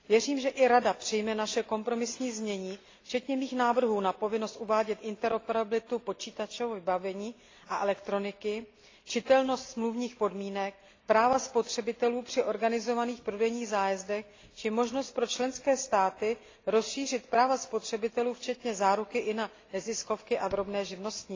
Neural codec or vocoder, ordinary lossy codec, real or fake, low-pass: none; AAC, 32 kbps; real; 7.2 kHz